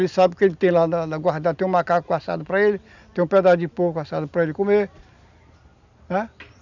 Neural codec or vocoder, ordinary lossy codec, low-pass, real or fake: none; none; 7.2 kHz; real